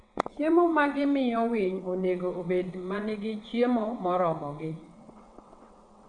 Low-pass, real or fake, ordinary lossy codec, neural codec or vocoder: 9.9 kHz; fake; Opus, 64 kbps; vocoder, 22.05 kHz, 80 mel bands, WaveNeXt